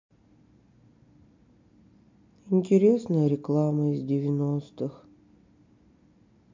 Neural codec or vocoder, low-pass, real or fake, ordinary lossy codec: none; 7.2 kHz; real; MP3, 48 kbps